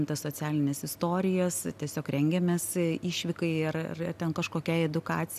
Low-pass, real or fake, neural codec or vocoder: 14.4 kHz; real; none